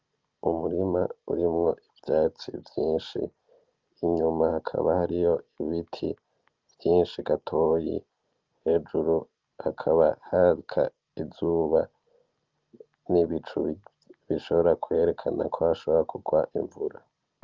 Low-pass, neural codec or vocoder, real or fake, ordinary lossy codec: 7.2 kHz; none; real; Opus, 32 kbps